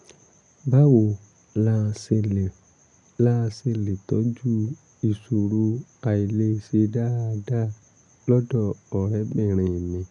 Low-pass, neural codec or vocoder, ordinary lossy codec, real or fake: 10.8 kHz; none; none; real